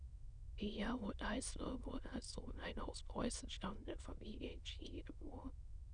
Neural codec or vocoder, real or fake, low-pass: autoencoder, 22.05 kHz, a latent of 192 numbers a frame, VITS, trained on many speakers; fake; 9.9 kHz